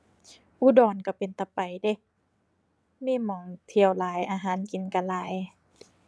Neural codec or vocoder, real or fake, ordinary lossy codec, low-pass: vocoder, 22.05 kHz, 80 mel bands, WaveNeXt; fake; none; none